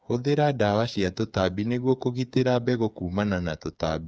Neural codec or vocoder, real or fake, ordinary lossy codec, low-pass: codec, 16 kHz, 8 kbps, FreqCodec, smaller model; fake; none; none